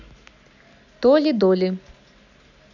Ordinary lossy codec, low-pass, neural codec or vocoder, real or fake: none; 7.2 kHz; none; real